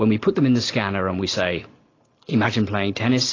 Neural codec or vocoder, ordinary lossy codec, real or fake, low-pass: none; AAC, 32 kbps; real; 7.2 kHz